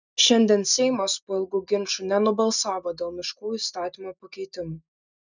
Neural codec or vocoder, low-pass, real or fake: none; 7.2 kHz; real